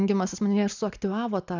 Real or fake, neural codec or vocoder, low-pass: real; none; 7.2 kHz